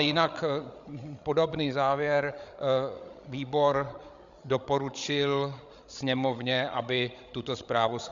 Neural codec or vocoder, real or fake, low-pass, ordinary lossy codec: codec, 16 kHz, 16 kbps, FreqCodec, larger model; fake; 7.2 kHz; Opus, 64 kbps